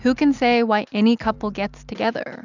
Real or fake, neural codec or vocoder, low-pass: real; none; 7.2 kHz